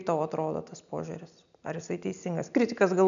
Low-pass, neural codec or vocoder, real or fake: 7.2 kHz; none; real